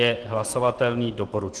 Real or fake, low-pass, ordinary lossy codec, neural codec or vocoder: real; 10.8 kHz; Opus, 16 kbps; none